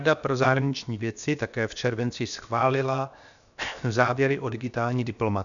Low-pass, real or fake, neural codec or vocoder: 7.2 kHz; fake; codec, 16 kHz, 0.7 kbps, FocalCodec